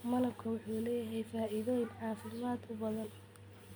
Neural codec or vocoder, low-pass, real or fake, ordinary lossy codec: none; none; real; none